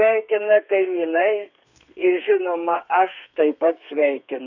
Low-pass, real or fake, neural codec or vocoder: 7.2 kHz; fake; codec, 32 kHz, 1.9 kbps, SNAC